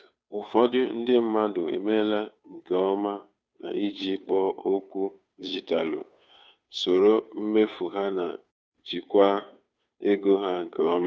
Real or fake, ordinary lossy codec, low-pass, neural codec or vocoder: fake; none; none; codec, 16 kHz, 2 kbps, FunCodec, trained on Chinese and English, 25 frames a second